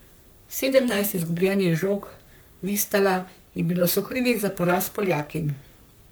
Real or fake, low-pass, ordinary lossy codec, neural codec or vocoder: fake; none; none; codec, 44.1 kHz, 3.4 kbps, Pupu-Codec